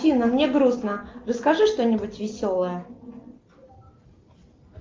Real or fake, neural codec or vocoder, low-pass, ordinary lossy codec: real; none; 7.2 kHz; Opus, 24 kbps